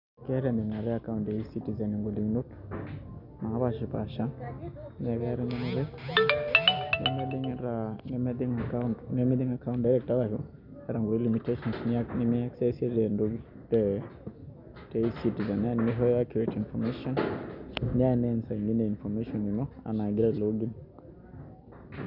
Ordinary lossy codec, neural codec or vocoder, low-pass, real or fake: none; none; 5.4 kHz; real